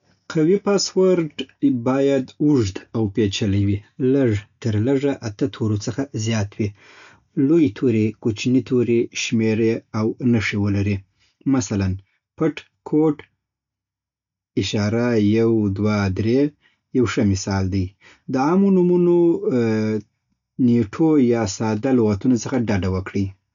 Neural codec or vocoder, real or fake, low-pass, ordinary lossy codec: none; real; 7.2 kHz; none